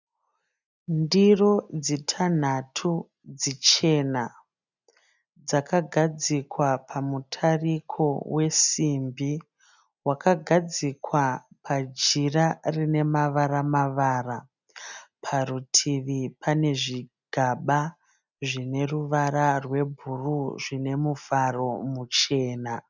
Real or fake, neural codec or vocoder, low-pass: real; none; 7.2 kHz